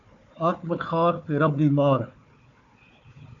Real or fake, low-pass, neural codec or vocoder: fake; 7.2 kHz; codec, 16 kHz, 4 kbps, FunCodec, trained on Chinese and English, 50 frames a second